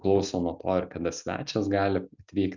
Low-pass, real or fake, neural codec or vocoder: 7.2 kHz; real; none